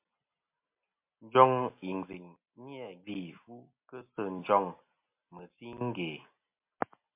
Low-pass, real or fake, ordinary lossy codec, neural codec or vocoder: 3.6 kHz; real; MP3, 32 kbps; none